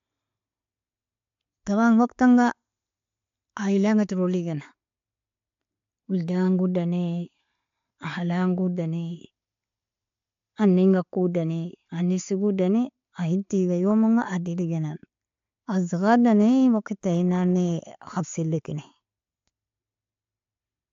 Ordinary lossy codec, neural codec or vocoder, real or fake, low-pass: AAC, 48 kbps; none; real; 7.2 kHz